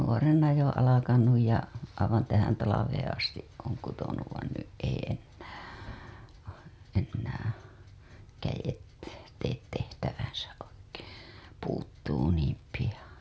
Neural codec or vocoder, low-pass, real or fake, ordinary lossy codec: none; none; real; none